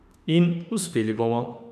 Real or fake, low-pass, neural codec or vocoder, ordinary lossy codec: fake; 14.4 kHz; autoencoder, 48 kHz, 32 numbers a frame, DAC-VAE, trained on Japanese speech; none